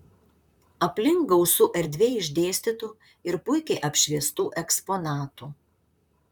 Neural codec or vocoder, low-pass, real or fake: vocoder, 44.1 kHz, 128 mel bands, Pupu-Vocoder; 19.8 kHz; fake